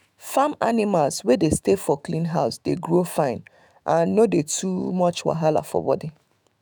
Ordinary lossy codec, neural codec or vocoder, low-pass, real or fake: none; autoencoder, 48 kHz, 128 numbers a frame, DAC-VAE, trained on Japanese speech; none; fake